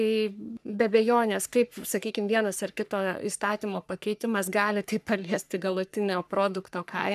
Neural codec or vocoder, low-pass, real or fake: codec, 44.1 kHz, 3.4 kbps, Pupu-Codec; 14.4 kHz; fake